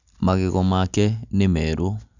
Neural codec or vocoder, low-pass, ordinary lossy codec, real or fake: none; 7.2 kHz; none; real